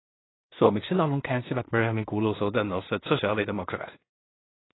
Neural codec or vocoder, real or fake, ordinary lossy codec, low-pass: codec, 16 kHz in and 24 kHz out, 0.4 kbps, LongCat-Audio-Codec, two codebook decoder; fake; AAC, 16 kbps; 7.2 kHz